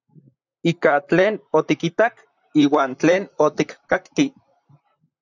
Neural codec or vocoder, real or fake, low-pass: vocoder, 44.1 kHz, 128 mel bands, Pupu-Vocoder; fake; 7.2 kHz